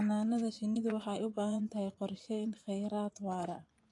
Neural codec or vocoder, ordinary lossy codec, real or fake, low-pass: vocoder, 24 kHz, 100 mel bands, Vocos; AAC, 48 kbps; fake; 10.8 kHz